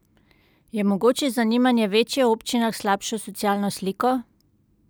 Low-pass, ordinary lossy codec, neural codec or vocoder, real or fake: none; none; none; real